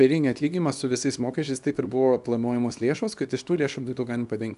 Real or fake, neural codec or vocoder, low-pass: fake; codec, 24 kHz, 0.9 kbps, WavTokenizer, small release; 10.8 kHz